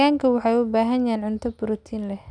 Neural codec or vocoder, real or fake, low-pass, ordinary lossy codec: none; real; 9.9 kHz; none